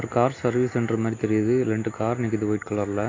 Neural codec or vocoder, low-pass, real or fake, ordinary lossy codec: none; 7.2 kHz; real; AAC, 32 kbps